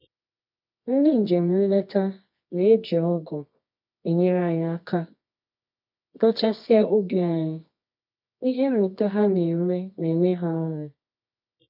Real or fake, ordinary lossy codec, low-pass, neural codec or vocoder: fake; MP3, 48 kbps; 5.4 kHz; codec, 24 kHz, 0.9 kbps, WavTokenizer, medium music audio release